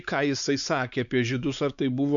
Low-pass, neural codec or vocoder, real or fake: 7.2 kHz; codec, 16 kHz, 4 kbps, X-Codec, WavLM features, trained on Multilingual LibriSpeech; fake